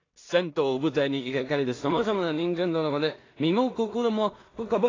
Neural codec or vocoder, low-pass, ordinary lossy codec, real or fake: codec, 16 kHz in and 24 kHz out, 0.4 kbps, LongCat-Audio-Codec, two codebook decoder; 7.2 kHz; AAC, 32 kbps; fake